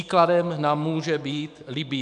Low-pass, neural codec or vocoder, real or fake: 10.8 kHz; autoencoder, 48 kHz, 128 numbers a frame, DAC-VAE, trained on Japanese speech; fake